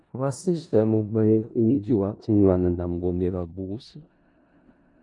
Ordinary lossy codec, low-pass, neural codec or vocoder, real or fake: MP3, 96 kbps; 10.8 kHz; codec, 16 kHz in and 24 kHz out, 0.4 kbps, LongCat-Audio-Codec, four codebook decoder; fake